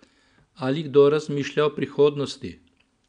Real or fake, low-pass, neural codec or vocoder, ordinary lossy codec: real; 9.9 kHz; none; none